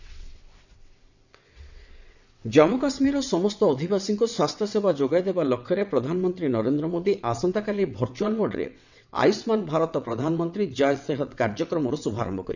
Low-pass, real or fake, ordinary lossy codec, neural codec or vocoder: 7.2 kHz; fake; none; vocoder, 22.05 kHz, 80 mel bands, WaveNeXt